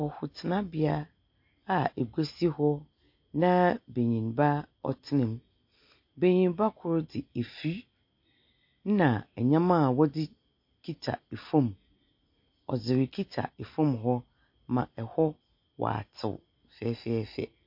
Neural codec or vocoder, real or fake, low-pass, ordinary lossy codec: none; real; 5.4 kHz; MP3, 32 kbps